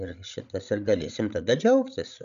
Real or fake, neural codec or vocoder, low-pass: fake; codec, 16 kHz, 16 kbps, FreqCodec, larger model; 7.2 kHz